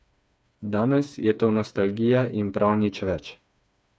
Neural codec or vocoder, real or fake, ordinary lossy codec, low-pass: codec, 16 kHz, 4 kbps, FreqCodec, smaller model; fake; none; none